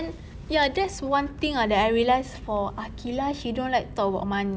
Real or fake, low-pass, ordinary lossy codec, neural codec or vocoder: real; none; none; none